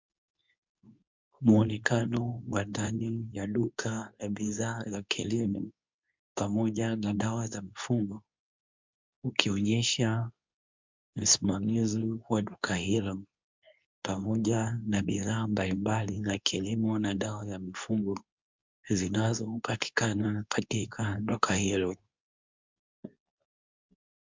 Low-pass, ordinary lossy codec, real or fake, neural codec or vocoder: 7.2 kHz; MP3, 64 kbps; fake; codec, 24 kHz, 0.9 kbps, WavTokenizer, medium speech release version 2